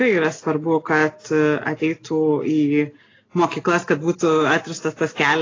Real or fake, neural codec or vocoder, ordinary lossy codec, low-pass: real; none; AAC, 32 kbps; 7.2 kHz